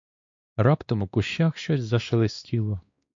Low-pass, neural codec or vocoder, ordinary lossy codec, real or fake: 7.2 kHz; codec, 16 kHz, 2 kbps, X-Codec, WavLM features, trained on Multilingual LibriSpeech; MP3, 48 kbps; fake